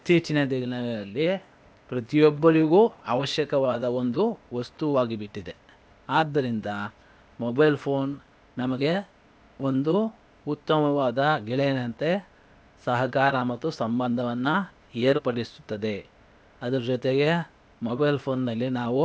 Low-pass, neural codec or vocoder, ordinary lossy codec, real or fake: none; codec, 16 kHz, 0.8 kbps, ZipCodec; none; fake